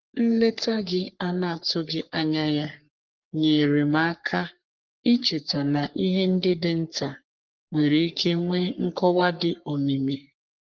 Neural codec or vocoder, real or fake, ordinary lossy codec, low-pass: codec, 44.1 kHz, 3.4 kbps, Pupu-Codec; fake; Opus, 32 kbps; 7.2 kHz